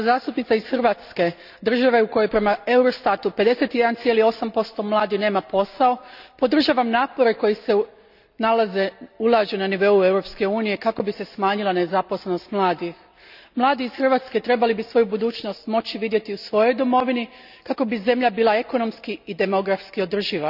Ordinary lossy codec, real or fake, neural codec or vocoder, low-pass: none; real; none; 5.4 kHz